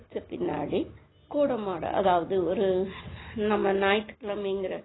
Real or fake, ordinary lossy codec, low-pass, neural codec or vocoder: real; AAC, 16 kbps; 7.2 kHz; none